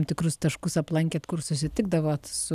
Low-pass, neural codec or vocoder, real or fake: 14.4 kHz; none; real